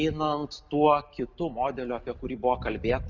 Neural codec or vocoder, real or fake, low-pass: none; real; 7.2 kHz